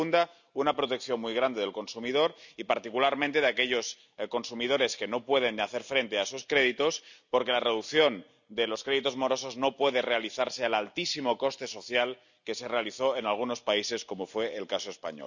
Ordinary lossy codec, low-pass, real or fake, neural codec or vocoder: none; 7.2 kHz; real; none